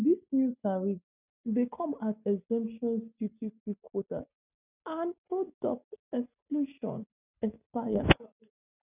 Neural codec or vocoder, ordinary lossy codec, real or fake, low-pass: none; none; real; 3.6 kHz